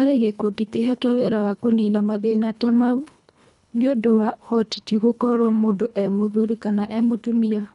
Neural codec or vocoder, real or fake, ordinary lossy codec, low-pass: codec, 24 kHz, 1.5 kbps, HILCodec; fake; none; 10.8 kHz